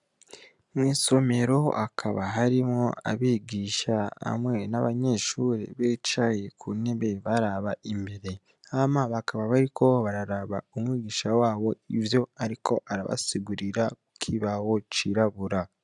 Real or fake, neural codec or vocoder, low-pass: real; none; 10.8 kHz